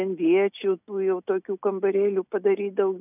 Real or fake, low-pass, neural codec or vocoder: real; 3.6 kHz; none